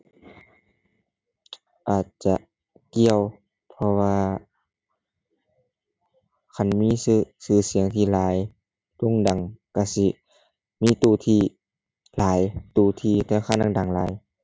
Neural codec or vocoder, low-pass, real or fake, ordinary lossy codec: none; none; real; none